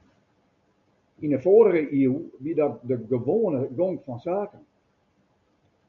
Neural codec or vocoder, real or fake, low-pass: none; real; 7.2 kHz